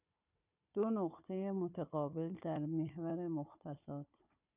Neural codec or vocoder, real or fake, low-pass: codec, 16 kHz, 4 kbps, FunCodec, trained on Chinese and English, 50 frames a second; fake; 3.6 kHz